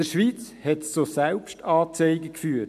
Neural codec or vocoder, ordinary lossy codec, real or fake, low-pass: none; AAC, 96 kbps; real; 14.4 kHz